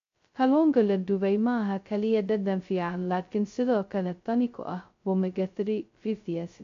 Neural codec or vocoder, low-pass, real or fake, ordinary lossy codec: codec, 16 kHz, 0.2 kbps, FocalCodec; 7.2 kHz; fake; AAC, 48 kbps